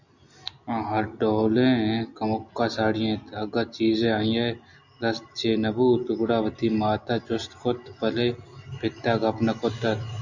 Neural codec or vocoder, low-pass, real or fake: none; 7.2 kHz; real